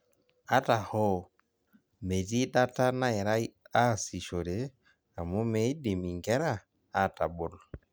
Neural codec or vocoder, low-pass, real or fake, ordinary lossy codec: none; none; real; none